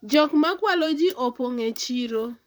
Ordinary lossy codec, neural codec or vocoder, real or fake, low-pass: none; vocoder, 44.1 kHz, 128 mel bands, Pupu-Vocoder; fake; none